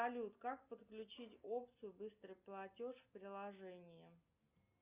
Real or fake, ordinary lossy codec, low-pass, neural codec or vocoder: real; Opus, 64 kbps; 3.6 kHz; none